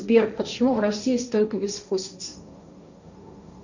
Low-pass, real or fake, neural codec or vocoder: 7.2 kHz; fake; codec, 16 kHz, 1.1 kbps, Voila-Tokenizer